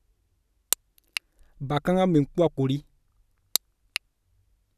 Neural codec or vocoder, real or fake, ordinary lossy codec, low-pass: none; real; none; 14.4 kHz